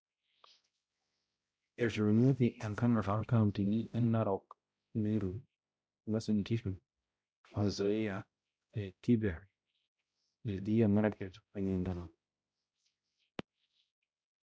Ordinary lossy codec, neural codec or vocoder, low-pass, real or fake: none; codec, 16 kHz, 0.5 kbps, X-Codec, HuBERT features, trained on balanced general audio; none; fake